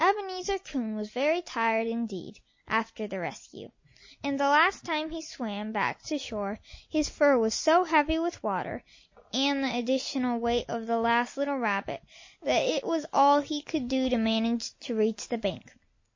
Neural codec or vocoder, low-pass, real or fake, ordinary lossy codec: none; 7.2 kHz; real; MP3, 32 kbps